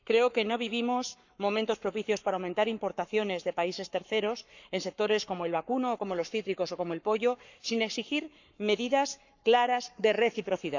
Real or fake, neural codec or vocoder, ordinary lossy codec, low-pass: fake; codec, 44.1 kHz, 7.8 kbps, Pupu-Codec; none; 7.2 kHz